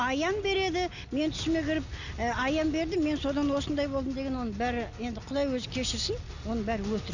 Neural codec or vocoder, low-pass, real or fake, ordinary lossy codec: none; 7.2 kHz; real; none